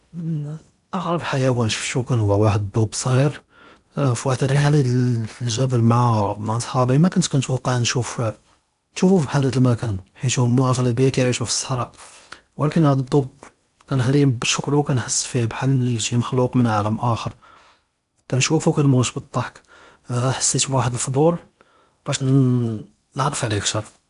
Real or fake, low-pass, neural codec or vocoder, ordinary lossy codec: fake; 10.8 kHz; codec, 16 kHz in and 24 kHz out, 0.8 kbps, FocalCodec, streaming, 65536 codes; AAC, 96 kbps